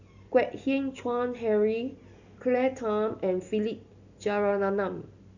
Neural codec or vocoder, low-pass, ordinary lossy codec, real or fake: none; 7.2 kHz; none; real